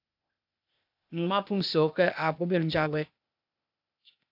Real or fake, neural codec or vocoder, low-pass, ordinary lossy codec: fake; codec, 16 kHz, 0.8 kbps, ZipCodec; 5.4 kHz; AAC, 48 kbps